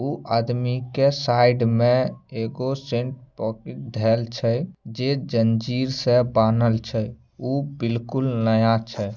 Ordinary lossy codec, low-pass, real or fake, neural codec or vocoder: none; 7.2 kHz; real; none